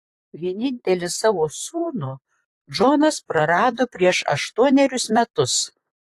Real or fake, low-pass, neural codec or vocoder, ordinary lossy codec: fake; 14.4 kHz; vocoder, 44.1 kHz, 128 mel bands, Pupu-Vocoder; AAC, 64 kbps